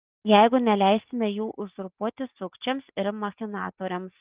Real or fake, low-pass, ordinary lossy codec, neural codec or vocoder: real; 3.6 kHz; Opus, 32 kbps; none